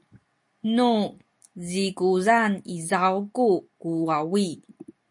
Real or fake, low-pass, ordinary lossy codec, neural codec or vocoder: real; 10.8 kHz; MP3, 48 kbps; none